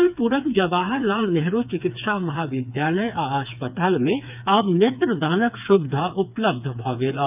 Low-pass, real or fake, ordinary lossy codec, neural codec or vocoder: 3.6 kHz; fake; none; codec, 16 kHz, 4 kbps, FreqCodec, smaller model